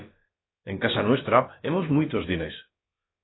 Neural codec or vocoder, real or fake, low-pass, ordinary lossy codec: codec, 16 kHz, about 1 kbps, DyCAST, with the encoder's durations; fake; 7.2 kHz; AAC, 16 kbps